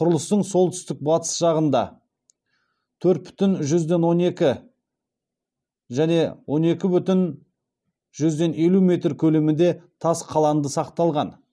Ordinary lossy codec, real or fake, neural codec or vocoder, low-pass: none; real; none; none